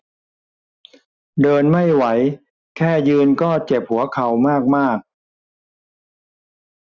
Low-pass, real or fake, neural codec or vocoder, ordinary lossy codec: 7.2 kHz; real; none; Opus, 64 kbps